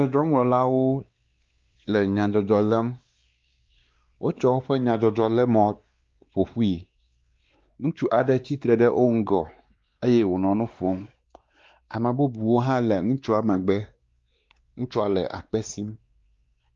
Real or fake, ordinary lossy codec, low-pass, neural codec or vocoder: fake; Opus, 32 kbps; 7.2 kHz; codec, 16 kHz, 2 kbps, X-Codec, WavLM features, trained on Multilingual LibriSpeech